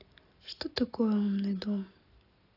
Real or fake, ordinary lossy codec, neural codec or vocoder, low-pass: real; AAC, 24 kbps; none; 5.4 kHz